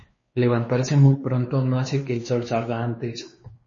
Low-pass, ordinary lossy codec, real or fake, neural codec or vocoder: 7.2 kHz; MP3, 32 kbps; fake; codec, 16 kHz, 2 kbps, X-Codec, WavLM features, trained on Multilingual LibriSpeech